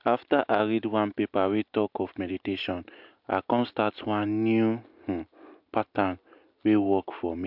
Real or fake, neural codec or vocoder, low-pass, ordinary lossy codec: real; none; 5.4 kHz; MP3, 48 kbps